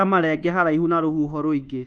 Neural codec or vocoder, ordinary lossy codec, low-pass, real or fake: codec, 24 kHz, 1.2 kbps, DualCodec; Opus, 32 kbps; 9.9 kHz; fake